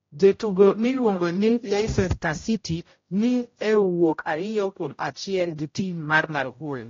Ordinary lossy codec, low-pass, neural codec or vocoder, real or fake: AAC, 32 kbps; 7.2 kHz; codec, 16 kHz, 0.5 kbps, X-Codec, HuBERT features, trained on general audio; fake